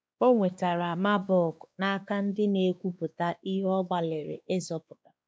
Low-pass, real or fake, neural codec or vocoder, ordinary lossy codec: none; fake; codec, 16 kHz, 2 kbps, X-Codec, WavLM features, trained on Multilingual LibriSpeech; none